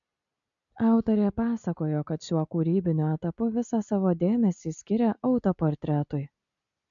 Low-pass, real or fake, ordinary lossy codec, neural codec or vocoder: 7.2 kHz; real; MP3, 96 kbps; none